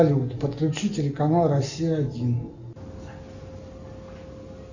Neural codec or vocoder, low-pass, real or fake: none; 7.2 kHz; real